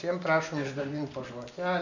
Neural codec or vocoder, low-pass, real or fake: none; 7.2 kHz; real